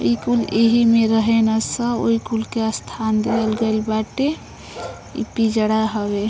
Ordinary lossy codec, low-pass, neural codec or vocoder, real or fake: none; none; none; real